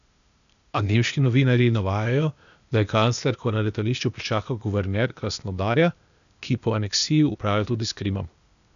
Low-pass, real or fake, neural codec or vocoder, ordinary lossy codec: 7.2 kHz; fake; codec, 16 kHz, 0.8 kbps, ZipCodec; none